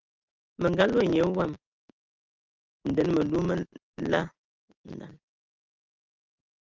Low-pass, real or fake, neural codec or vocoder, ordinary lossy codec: 7.2 kHz; real; none; Opus, 24 kbps